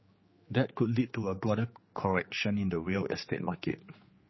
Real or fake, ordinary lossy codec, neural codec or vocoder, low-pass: fake; MP3, 24 kbps; codec, 16 kHz, 4 kbps, X-Codec, HuBERT features, trained on general audio; 7.2 kHz